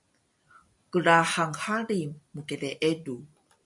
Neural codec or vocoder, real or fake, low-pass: none; real; 10.8 kHz